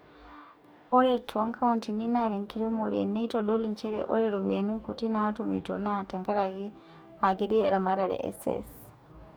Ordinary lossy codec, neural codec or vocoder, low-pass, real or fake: none; codec, 44.1 kHz, 2.6 kbps, DAC; none; fake